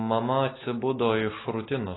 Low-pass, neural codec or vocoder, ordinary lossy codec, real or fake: 7.2 kHz; none; AAC, 16 kbps; real